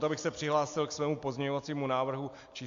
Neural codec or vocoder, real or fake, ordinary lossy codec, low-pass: none; real; AAC, 64 kbps; 7.2 kHz